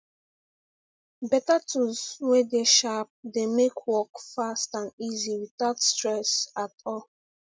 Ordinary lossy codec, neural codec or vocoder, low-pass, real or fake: none; none; none; real